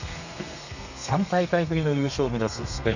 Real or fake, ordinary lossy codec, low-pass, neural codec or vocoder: fake; none; 7.2 kHz; codec, 32 kHz, 1.9 kbps, SNAC